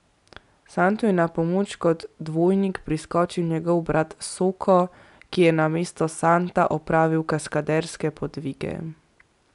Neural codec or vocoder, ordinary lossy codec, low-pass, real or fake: none; none; 10.8 kHz; real